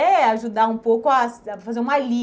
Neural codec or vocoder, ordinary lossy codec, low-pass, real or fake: none; none; none; real